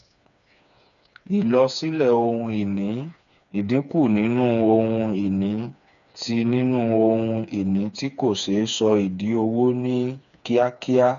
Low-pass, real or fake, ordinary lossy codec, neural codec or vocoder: 7.2 kHz; fake; none; codec, 16 kHz, 4 kbps, FreqCodec, smaller model